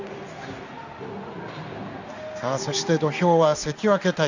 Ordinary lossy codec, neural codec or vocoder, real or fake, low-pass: none; codec, 16 kHz in and 24 kHz out, 1 kbps, XY-Tokenizer; fake; 7.2 kHz